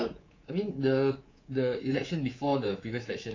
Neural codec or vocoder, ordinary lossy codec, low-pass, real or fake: codec, 24 kHz, 3.1 kbps, DualCodec; AAC, 32 kbps; 7.2 kHz; fake